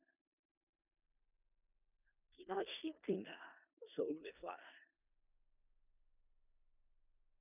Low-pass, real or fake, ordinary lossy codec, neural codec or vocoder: 3.6 kHz; fake; none; codec, 16 kHz in and 24 kHz out, 0.4 kbps, LongCat-Audio-Codec, four codebook decoder